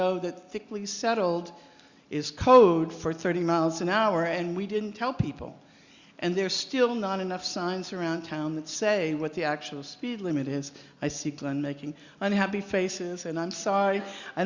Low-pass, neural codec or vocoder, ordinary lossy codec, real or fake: 7.2 kHz; none; Opus, 64 kbps; real